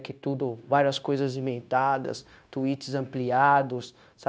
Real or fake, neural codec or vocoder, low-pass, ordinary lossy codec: fake; codec, 16 kHz, 0.9 kbps, LongCat-Audio-Codec; none; none